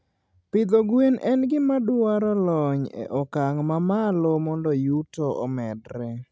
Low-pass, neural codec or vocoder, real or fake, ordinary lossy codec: none; none; real; none